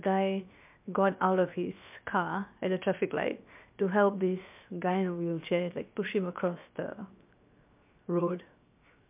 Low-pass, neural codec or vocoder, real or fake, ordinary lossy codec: 3.6 kHz; codec, 16 kHz, 0.7 kbps, FocalCodec; fake; MP3, 32 kbps